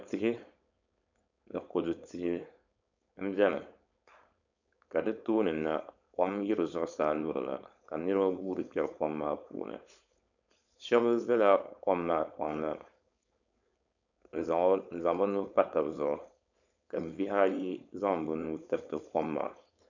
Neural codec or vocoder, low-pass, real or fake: codec, 16 kHz, 4.8 kbps, FACodec; 7.2 kHz; fake